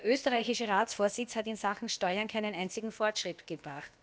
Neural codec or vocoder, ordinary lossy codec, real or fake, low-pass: codec, 16 kHz, about 1 kbps, DyCAST, with the encoder's durations; none; fake; none